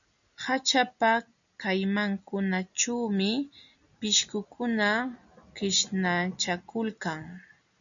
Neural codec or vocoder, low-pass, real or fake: none; 7.2 kHz; real